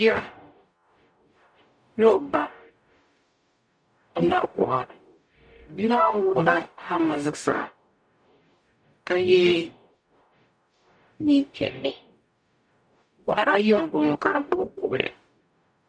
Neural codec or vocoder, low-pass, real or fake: codec, 44.1 kHz, 0.9 kbps, DAC; 9.9 kHz; fake